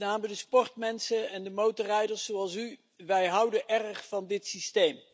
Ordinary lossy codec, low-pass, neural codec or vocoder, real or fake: none; none; none; real